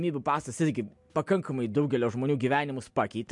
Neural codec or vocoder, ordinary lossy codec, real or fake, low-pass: none; MP3, 64 kbps; real; 10.8 kHz